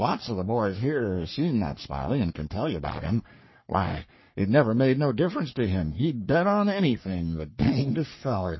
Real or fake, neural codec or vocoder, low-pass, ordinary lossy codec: fake; codec, 44.1 kHz, 3.4 kbps, Pupu-Codec; 7.2 kHz; MP3, 24 kbps